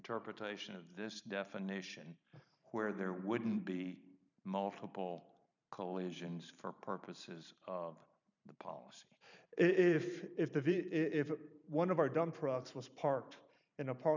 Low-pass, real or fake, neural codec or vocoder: 7.2 kHz; fake; vocoder, 44.1 kHz, 128 mel bands every 512 samples, BigVGAN v2